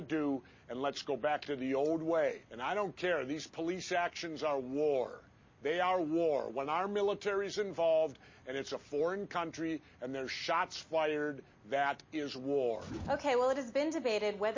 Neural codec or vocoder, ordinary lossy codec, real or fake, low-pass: none; MP3, 32 kbps; real; 7.2 kHz